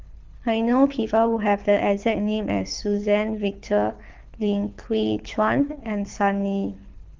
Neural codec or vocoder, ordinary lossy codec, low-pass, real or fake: codec, 24 kHz, 6 kbps, HILCodec; Opus, 32 kbps; 7.2 kHz; fake